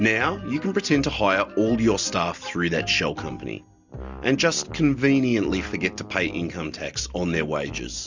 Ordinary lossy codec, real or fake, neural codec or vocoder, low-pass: Opus, 64 kbps; real; none; 7.2 kHz